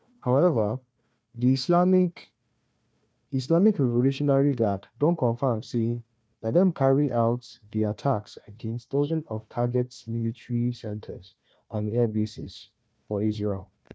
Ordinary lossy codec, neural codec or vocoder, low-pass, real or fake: none; codec, 16 kHz, 1 kbps, FunCodec, trained on Chinese and English, 50 frames a second; none; fake